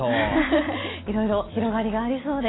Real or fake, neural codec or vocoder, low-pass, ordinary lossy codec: real; none; 7.2 kHz; AAC, 16 kbps